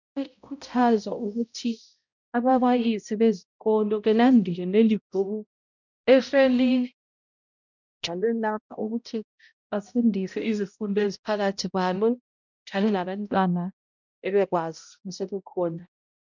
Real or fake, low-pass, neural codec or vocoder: fake; 7.2 kHz; codec, 16 kHz, 0.5 kbps, X-Codec, HuBERT features, trained on balanced general audio